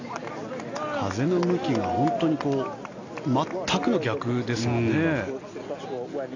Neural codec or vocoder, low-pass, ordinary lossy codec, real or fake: none; 7.2 kHz; AAC, 48 kbps; real